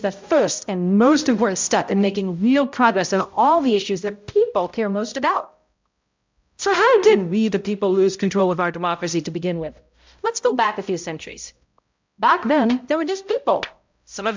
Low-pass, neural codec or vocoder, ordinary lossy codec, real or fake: 7.2 kHz; codec, 16 kHz, 0.5 kbps, X-Codec, HuBERT features, trained on balanced general audio; MP3, 64 kbps; fake